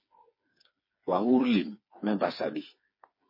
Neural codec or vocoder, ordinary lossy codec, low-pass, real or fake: codec, 16 kHz, 4 kbps, FreqCodec, smaller model; MP3, 24 kbps; 5.4 kHz; fake